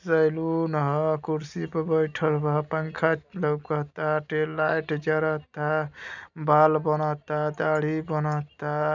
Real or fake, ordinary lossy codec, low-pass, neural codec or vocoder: real; none; 7.2 kHz; none